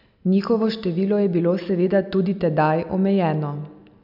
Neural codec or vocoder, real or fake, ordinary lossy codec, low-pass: none; real; none; 5.4 kHz